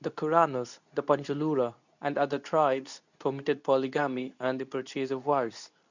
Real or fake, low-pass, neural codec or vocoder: fake; 7.2 kHz; codec, 24 kHz, 0.9 kbps, WavTokenizer, medium speech release version 2